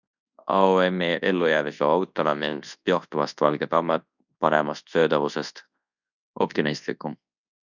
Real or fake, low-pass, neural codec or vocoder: fake; 7.2 kHz; codec, 24 kHz, 0.9 kbps, WavTokenizer, large speech release